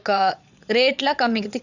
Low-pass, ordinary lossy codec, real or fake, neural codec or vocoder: 7.2 kHz; none; fake; vocoder, 22.05 kHz, 80 mel bands, Vocos